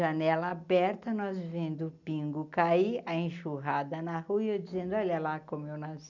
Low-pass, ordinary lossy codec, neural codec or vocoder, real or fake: 7.2 kHz; none; none; real